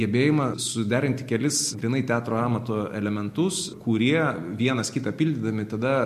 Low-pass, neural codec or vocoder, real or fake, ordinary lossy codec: 14.4 kHz; none; real; MP3, 64 kbps